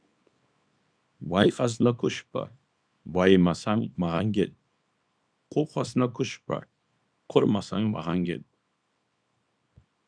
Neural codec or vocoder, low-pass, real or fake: codec, 24 kHz, 0.9 kbps, WavTokenizer, small release; 9.9 kHz; fake